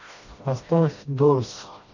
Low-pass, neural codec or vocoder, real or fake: 7.2 kHz; codec, 16 kHz, 1 kbps, FreqCodec, smaller model; fake